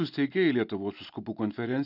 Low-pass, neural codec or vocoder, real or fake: 5.4 kHz; none; real